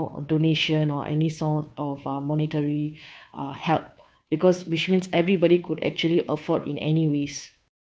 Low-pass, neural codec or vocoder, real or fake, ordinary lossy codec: none; codec, 16 kHz, 2 kbps, FunCodec, trained on Chinese and English, 25 frames a second; fake; none